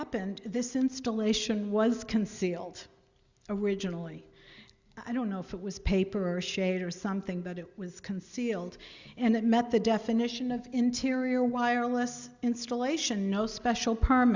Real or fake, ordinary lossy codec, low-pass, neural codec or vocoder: real; Opus, 64 kbps; 7.2 kHz; none